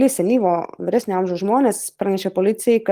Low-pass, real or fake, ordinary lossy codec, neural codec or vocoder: 14.4 kHz; real; Opus, 24 kbps; none